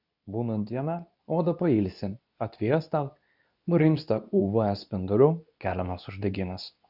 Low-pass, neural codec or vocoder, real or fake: 5.4 kHz; codec, 24 kHz, 0.9 kbps, WavTokenizer, medium speech release version 2; fake